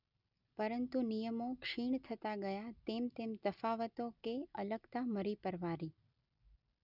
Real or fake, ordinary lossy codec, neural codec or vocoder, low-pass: real; none; none; 5.4 kHz